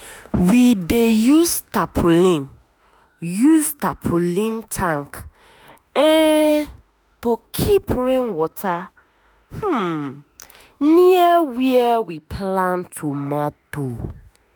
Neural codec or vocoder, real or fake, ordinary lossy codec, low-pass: autoencoder, 48 kHz, 32 numbers a frame, DAC-VAE, trained on Japanese speech; fake; none; none